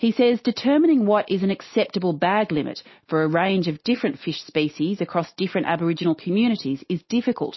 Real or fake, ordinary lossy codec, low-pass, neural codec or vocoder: real; MP3, 24 kbps; 7.2 kHz; none